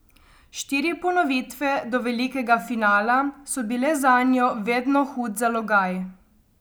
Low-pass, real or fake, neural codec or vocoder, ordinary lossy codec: none; real; none; none